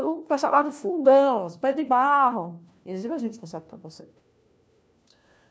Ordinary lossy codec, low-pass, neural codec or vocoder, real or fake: none; none; codec, 16 kHz, 1 kbps, FunCodec, trained on LibriTTS, 50 frames a second; fake